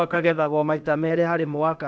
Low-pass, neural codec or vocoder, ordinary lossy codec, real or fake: none; codec, 16 kHz, 0.8 kbps, ZipCodec; none; fake